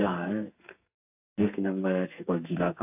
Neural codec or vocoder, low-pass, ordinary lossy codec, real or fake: codec, 32 kHz, 1.9 kbps, SNAC; 3.6 kHz; AAC, 32 kbps; fake